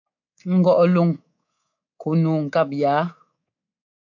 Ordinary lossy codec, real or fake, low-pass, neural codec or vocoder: AAC, 48 kbps; fake; 7.2 kHz; codec, 24 kHz, 3.1 kbps, DualCodec